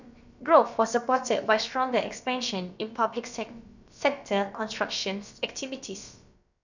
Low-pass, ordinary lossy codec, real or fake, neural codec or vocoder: 7.2 kHz; none; fake; codec, 16 kHz, about 1 kbps, DyCAST, with the encoder's durations